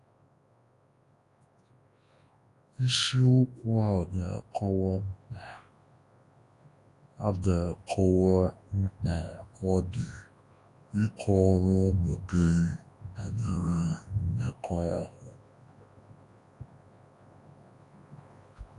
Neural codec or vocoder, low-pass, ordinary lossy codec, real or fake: codec, 24 kHz, 0.9 kbps, WavTokenizer, large speech release; 10.8 kHz; AAC, 48 kbps; fake